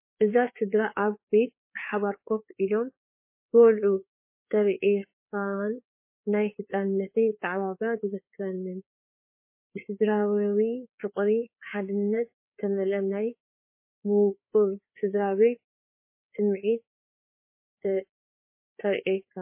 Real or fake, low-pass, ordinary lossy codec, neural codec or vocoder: fake; 3.6 kHz; MP3, 24 kbps; codec, 16 kHz, 4 kbps, FreqCodec, larger model